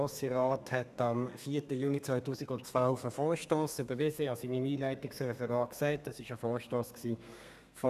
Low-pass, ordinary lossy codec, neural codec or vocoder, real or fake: 14.4 kHz; none; codec, 32 kHz, 1.9 kbps, SNAC; fake